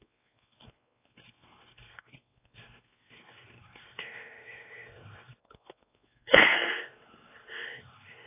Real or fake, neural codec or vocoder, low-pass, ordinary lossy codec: fake; codec, 16 kHz, 2 kbps, X-Codec, WavLM features, trained on Multilingual LibriSpeech; 3.6 kHz; AAC, 24 kbps